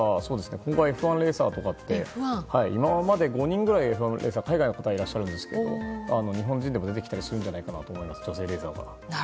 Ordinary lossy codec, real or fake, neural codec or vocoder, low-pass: none; real; none; none